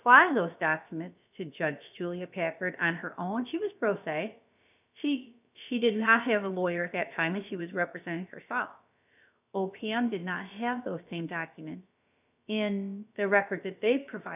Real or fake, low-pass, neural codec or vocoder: fake; 3.6 kHz; codec, 16 kHz, about 1 kbps, DyCAST, with the encoder's durations